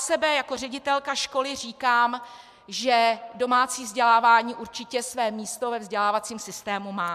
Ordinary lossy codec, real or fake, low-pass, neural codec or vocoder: MP3, 96 kbps; real; 14.4 kHz; none